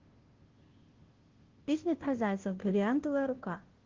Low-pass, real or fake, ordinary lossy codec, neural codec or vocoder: 7.2 kHz; fake; Opus, 16 kbps; codec, 16 kHz, 0.5 kbps, FunCodec, trained on Chinese and English, 25 frames a second